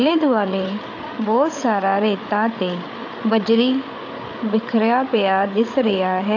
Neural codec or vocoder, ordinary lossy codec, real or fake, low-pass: codec, 16 kHz, 8 kbps, FreqCodec, larger model; AAC, 48 kbps; fake; 7.2 kHz